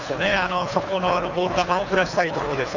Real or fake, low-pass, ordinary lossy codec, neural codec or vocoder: fake; 7.2 kHz; none; codec, 24 kHz, 3 kbps, HILCodec